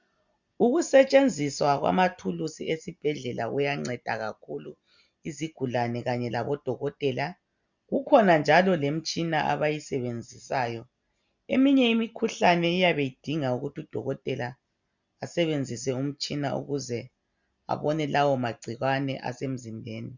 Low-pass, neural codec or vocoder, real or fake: 7.2 kHz; none; real